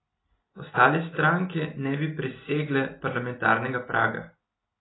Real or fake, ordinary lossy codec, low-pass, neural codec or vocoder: real; AAC, 16 kbps; 7.2 kHz; none